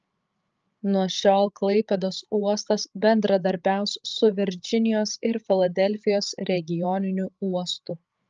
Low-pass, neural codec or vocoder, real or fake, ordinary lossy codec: 7.2 kHz; codec, 16 kHz, 16 kbps, FreqCodec, larger model; fake; Opus, 24 kbps